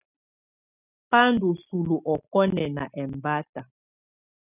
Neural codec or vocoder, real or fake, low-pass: none; real; 3.6 kHz